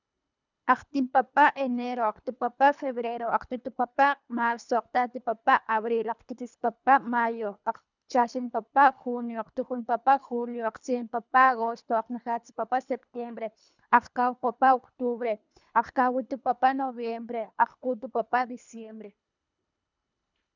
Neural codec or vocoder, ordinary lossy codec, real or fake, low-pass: codec, 24 kHz, 3 kbps, HILCodec; none; fake; 7.2 kHz